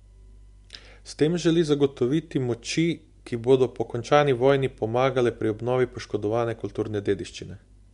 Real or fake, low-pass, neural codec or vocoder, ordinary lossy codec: real; 10.8 kHz; none; MP3, 64 kbps